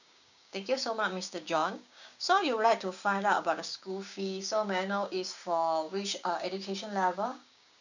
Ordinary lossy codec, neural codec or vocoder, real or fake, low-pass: none; codec, 16 kHz, 6 kbps, DAC; fake; 7.2 kHz